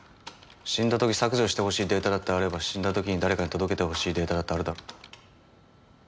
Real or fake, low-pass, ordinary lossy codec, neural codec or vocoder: real; none; none; none